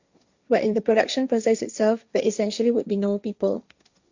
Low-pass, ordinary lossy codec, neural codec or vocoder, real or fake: 7.2 kHz; Opus, 64 kbps; codec, 16 kHz, 1.1 kbps, Voila-Tokenizer; fake